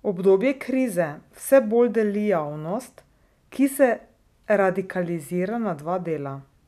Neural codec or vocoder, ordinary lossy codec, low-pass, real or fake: none; none; 14.4 kHz; real